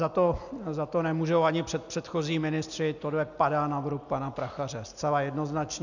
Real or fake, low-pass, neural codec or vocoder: real; 7.2 kHz; none